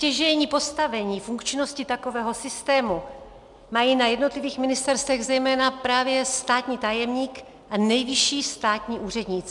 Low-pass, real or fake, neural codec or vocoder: 10.8 kHz; real; none